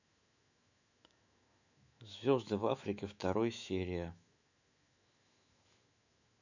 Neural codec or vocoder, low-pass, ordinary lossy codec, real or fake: autoencoder, 48 kHz, 128 numbers a frame, DAC-VAE, trained on Japanese speech; 7.2 kHz; none; fake